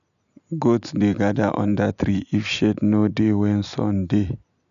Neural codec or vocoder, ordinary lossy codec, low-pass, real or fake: none; none; 7.2 kHz; real